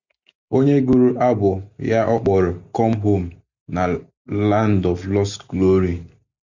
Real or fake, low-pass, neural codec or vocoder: real; 7.2 kHz; none